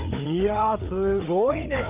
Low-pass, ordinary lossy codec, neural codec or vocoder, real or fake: 3.6 kHz; Opus, 24 kbps; codec, 16 kHz, 4 kbps, FreqCodec, larger model; fake